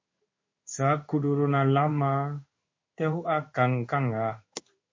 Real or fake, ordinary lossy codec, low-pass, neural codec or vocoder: fake; MP3, 32 kbps; 7.2 kHz; codec, 16 kHz in and 24 kHz out, 1 kbps, XY-Tokenizer